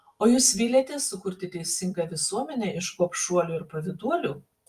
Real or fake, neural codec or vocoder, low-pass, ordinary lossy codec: real; none; 14.4 kHz; Opus, 32 kbps